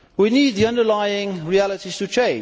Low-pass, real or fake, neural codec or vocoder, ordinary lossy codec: none; real; none; none